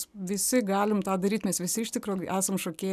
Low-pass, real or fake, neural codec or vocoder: 14.4 kHz; real; none